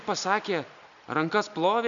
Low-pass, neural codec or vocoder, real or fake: 7.2 kHz; none; real